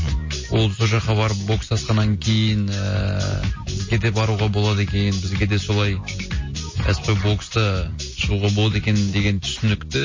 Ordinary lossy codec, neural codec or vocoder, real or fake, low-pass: MP3, 32 kbps; none; real; 7.2 kHz